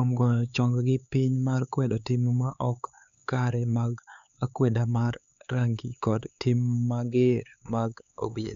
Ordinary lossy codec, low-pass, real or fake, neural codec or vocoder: none; 7.2 kHz; fake; codec, 16 kHz, 4 kbps, X-Codec, HuBERT features, trained on LibriSpeech